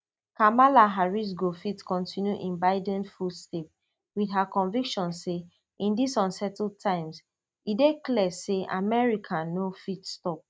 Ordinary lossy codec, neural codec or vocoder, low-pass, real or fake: none; none; none; real